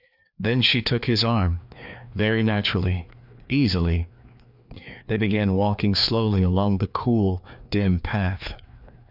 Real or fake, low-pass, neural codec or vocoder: fake; 5.4 kHz; codec, 16 kHz, 2 kbps, FreqCodec, larger model